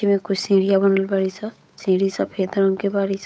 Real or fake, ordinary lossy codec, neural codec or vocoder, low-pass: fake; none; codec, 16 kHz, 8 kbps, FunCodec, trained on Chinese and English, 25 frames a second; none